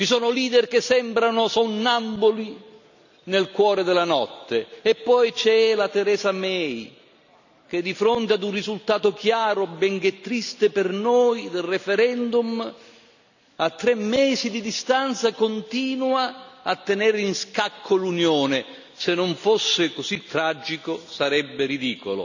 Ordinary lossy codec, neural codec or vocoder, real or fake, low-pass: none; none; real; 7.2 kHz